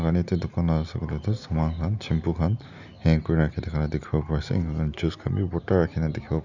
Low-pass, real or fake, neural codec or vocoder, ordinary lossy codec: 7.2 kHz; real; none; none